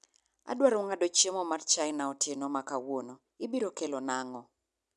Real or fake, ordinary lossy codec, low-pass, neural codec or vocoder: real; none; none; none